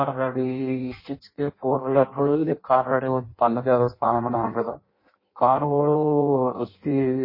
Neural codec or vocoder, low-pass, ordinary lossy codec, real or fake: codec, 16 kHz in and 24 kHz out, 0.6 kbps, FireRedTTS-2 codec; 5.4 kHz; MP3, 24 kbps; fake